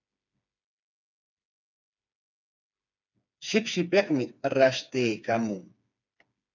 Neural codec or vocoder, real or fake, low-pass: codec, 16 kHz, 4 kbps, FreqCodec, smaller model; fake; 7.2 kHz